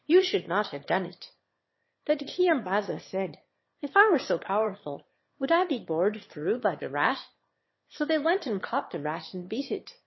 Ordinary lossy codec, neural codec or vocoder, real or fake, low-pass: MP3, 24 kbps; autoencoder, 22.05 kHz, a latent of 192 numbers a frame, VITS, trained on one speaker; fake; 7.2 kHz